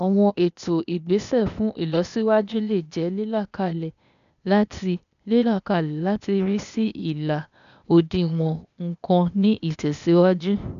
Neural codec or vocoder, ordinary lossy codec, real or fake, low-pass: codec, 16 kHz, 0.8 kbps, ZipCodec; none; fake; 7.2 kHz